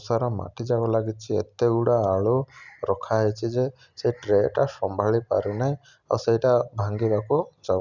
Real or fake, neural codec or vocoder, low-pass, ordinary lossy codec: real; none; 7.2 kHz; none